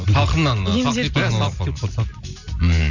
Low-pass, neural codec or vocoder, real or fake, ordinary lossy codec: 7.2 kHz; none; real; none